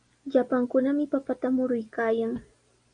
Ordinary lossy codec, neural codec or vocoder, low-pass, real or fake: AAC, 48 kbps; none; 9.9 kHz; real